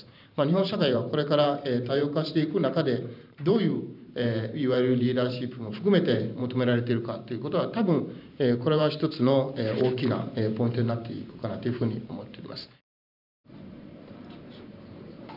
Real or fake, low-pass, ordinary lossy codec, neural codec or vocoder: fake; 5.4 kHz; none; vocoder, 44.1 kHz, 128 mel bands every 512 samples, BigVGAN v2